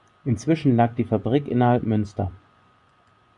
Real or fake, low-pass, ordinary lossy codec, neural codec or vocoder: fake; 10.8 kHz; MP3, 96 kbps; vocoder, 24 kHz, 100 mel bands, Vocos